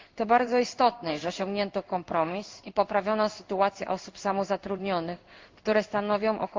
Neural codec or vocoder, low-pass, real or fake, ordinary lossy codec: codec, 16 kHz in and 24 kHz out, 1 kbps, XY-Tokenizer; 7.2 kHz; fake; Opus, 24 kbps